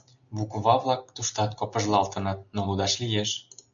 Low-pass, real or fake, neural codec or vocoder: 7.2 kHz; real; none